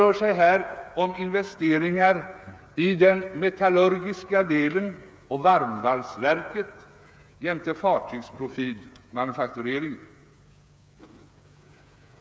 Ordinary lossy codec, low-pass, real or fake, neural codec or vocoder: none; none; fake; codec, 16 kHz, 8 kbps, FreqCodec, smaller model